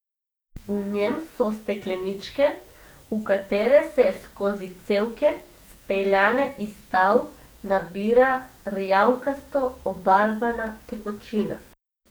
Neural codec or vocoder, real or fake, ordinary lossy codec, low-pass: codec, 44.1 kHz, 2.6 kbps, SNAC; fake; none; none